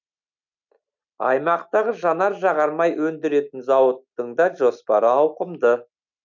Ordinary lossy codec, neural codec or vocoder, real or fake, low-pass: none; none; real; 7.2 kHz